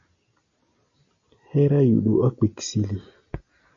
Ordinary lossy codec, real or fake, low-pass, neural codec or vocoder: AAC, 64 kbps; real; 7.2 kHz; none